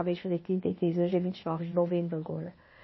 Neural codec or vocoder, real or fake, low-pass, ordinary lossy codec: codec, 16 kHz, 0.8 kbps, ZipCodec; fake; 7.2 kHz; MP3, 24 kbps